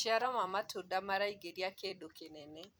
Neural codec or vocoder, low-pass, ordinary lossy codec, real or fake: vocoder, 44.1 kHz, 128 mel bands every 256 samples, BigVGAN v2; none; none; fake